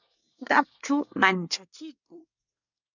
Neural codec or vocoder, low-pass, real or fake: codec, 24 kHz, 1 kbps, SNAC; 7.2 kHz; fake